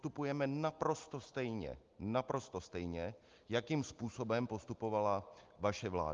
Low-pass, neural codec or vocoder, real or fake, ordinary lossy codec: 7.2 kHz; none; real; Opus, 32 kbps